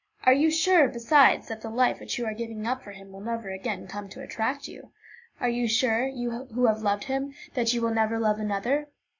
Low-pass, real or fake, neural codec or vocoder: 7.2 kHz; real; none